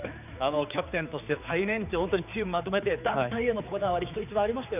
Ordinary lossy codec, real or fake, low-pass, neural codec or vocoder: none; fake; 3.6 kHz; codec, 16 kHz, 4 kbps, X-Codec, HuBERT features, trained on general audio